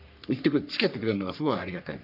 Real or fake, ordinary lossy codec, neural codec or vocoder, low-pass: fake; none; codec, 44.1 kHz, 3.4 kbps, Pupu-Codec; 5.4 kHz